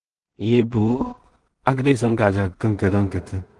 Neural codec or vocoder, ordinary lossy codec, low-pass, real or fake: codec, 16 kHz in and 24 kHz out, 0.4 kbps, LongCat-Audio-Codec, two codebook decoder; Opus, 24 kbps; 10.8 kHz; fake